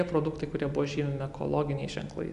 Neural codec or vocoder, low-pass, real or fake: none; 10.8 kHz; real